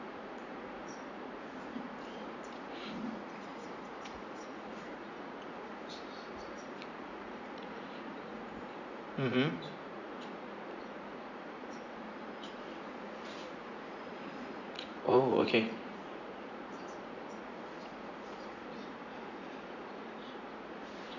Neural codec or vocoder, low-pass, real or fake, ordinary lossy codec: vocoder, 44.1 kHz, 80 mel bands, Vocos; 7.2 kHz; fake; none